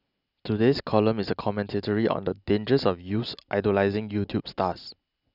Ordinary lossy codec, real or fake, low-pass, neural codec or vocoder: none; real; 5.4 kHz; none